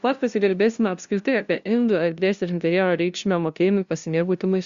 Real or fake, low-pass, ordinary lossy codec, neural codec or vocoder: fake; 7.2 kHz; Opus, 64 kbps; codec, 16 kHz, 0.5 kbps, FunCodec, trained on LibriTTS, 25 frames a second